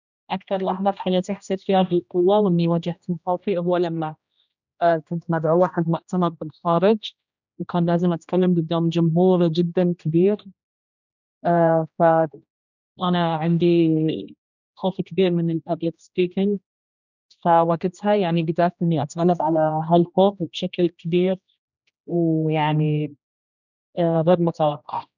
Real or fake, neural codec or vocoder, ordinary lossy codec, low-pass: fake; codec, 16 kHz, 1 kbps, X-Codec, HuBERT features, trained on general audio; none; 7.2 kHz